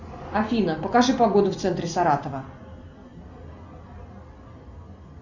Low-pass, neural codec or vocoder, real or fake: 7.2 kHz; none; real